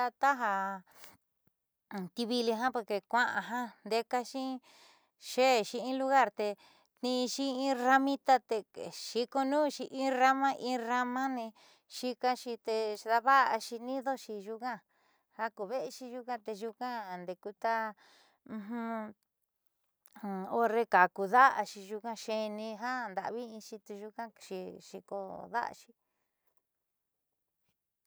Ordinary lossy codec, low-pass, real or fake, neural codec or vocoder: none; none; real; none